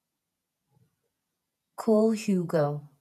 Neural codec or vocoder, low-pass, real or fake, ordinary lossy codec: vocoder, 48 kHz, 128 mel bands, Vocos; 14.4 kHz; fake; none